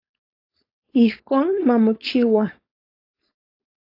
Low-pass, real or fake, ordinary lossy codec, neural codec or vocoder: 5.4 kHz; fake; AAC, 32 kbps; codec, 16 kHz, 4.8 kbps, FACodec